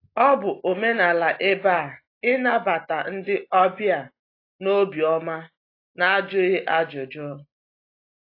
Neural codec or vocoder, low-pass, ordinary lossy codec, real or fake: none; 5.4 kHz; AAC, 32 kbps; real